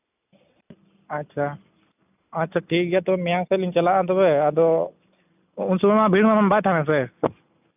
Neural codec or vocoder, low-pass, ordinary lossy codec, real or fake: none; 3.6 kHz; none; real